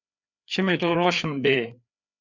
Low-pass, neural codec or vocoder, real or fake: 7.2 kHz; codec, 16 kHz, 4 kbps, FreqCodec, larger model; fake